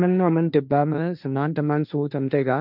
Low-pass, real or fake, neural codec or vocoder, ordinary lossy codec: 5.4 kHz; fake; codec, 16 kHz, 1.1 kbps, Voila-Tokenizer; none